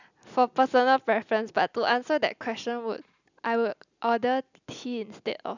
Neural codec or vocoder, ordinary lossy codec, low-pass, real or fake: none; none; 7.2 kHz; real